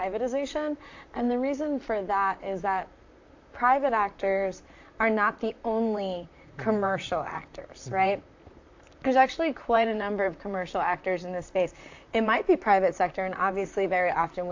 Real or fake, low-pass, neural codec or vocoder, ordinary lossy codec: fake; 7.2 kHz; vocoder, 44.1 kHz, 128 mel bands, Pupu-Vocoder; AAC, 48 kbps